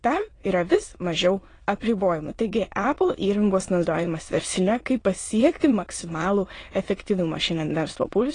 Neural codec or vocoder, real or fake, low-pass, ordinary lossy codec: autoencoder, 22.05 kHz, a latent of 192 numbers a frame, VITS, trained on many speakers; fake; 9.9 kHz; AAC, 32 kbps